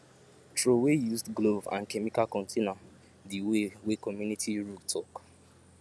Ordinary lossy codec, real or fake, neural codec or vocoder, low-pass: none; real; none; none